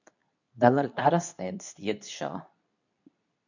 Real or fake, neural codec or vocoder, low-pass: fake; codec, 24 kHz, 0.9 kbps, WavTokenizer, medium speech release version 2; 7.2 kHz